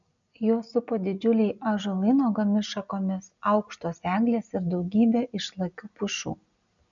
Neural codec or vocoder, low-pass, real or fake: none; 7.2 kHz; real